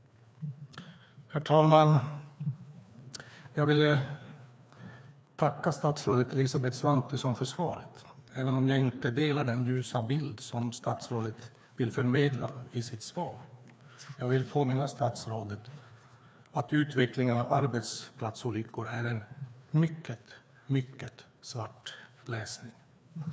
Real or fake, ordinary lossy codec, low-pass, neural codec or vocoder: fake; none; none; codec, 16 kHz, 2 kbps, FreqCodec, larger model